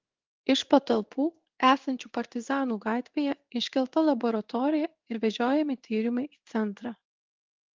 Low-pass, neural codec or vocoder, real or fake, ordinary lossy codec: 7.2 kHz; codec, 16 kHz in and 24 kHz out, 1 kbps, XY-Tokenizer; fake; Opus, 32 kbps